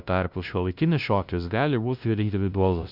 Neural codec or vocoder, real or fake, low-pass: codec, 16 kHz, 0.5 kbps, FunCodec, trained on LibriTTS, 25 frames a second; fake; 5.4 kHz